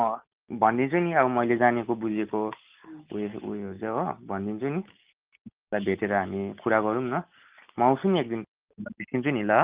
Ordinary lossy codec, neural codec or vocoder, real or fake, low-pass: Opus, 32 kbps; none; real; 3.6 kHz